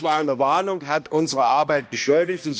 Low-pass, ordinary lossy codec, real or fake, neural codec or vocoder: none; none; fake; codec, 16 kHz, 1 kbps, X-Codec, HuBERT features, trained on balanced general audio